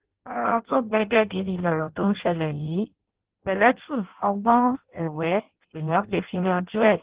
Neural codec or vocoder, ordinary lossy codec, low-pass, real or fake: codec, 16 kHz in and 24 kHz out, 0.6 kbps, FireRedTTS-2 codec; Opus, 16 kbps; 3.6 kHz; fake